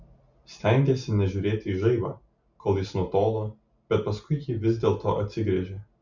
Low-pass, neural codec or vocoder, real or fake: 7.2 kHz; none; real